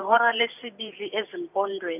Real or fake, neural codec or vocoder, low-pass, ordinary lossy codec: fake; vocoder, 44.1 kHz, 128 mel bands every 512 samples, BigVGAN v2; 3.6 kHz; none